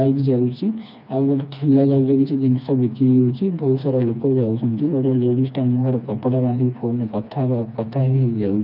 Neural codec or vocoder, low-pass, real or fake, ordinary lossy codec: codec, 16 kHz, 2 kbps, FreqCodec, smaller model; 5.4 kHz; fake; none